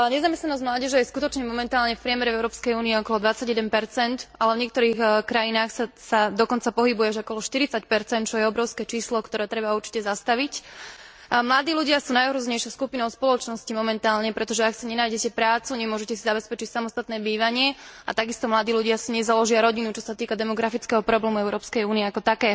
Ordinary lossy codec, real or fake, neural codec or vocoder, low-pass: none; real; none; none